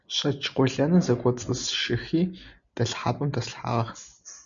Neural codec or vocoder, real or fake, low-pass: none; real; 7.2 kHz